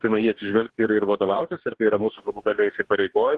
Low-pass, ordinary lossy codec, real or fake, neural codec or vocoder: 10.8 kHz; Opus, 16 kbps; fake; codec, 44.1 kHz, 2.6 kbps, DAC